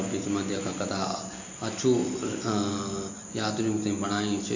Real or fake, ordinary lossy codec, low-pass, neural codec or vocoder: real; AAC, 48 kbps; 7.2 kHz; none